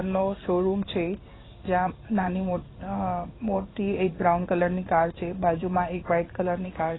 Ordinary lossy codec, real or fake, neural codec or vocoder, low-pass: AAC, 16 kbps; fake; codec, 16 kHz in and 24 kHz out, 1 kbps, XY-Tokenizer; 7.2 kHz